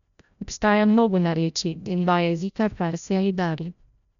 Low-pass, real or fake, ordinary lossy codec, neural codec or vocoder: 7.2 kHz; fake; none; codec, 16 kHz, 0.5 kbps, FreqCodec, larger model